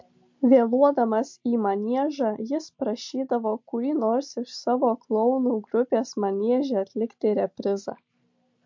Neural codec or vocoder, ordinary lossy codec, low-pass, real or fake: none; MP3, 48 kbps; 7.2 kHz; real